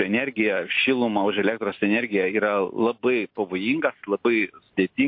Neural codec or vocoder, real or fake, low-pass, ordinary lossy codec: none; real; 5.4 kHz; MP3, 32 kbps